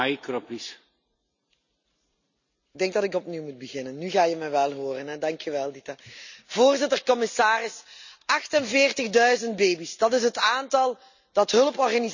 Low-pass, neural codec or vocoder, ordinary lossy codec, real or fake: 7.2 kHz; none; none; real